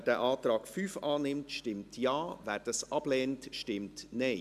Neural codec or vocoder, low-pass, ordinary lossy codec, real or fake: none; 14.4 kHz; AAC, 96 kbps; real